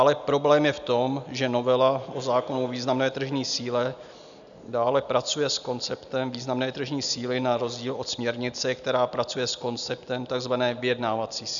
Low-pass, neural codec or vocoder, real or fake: 7.2 kHz; none; real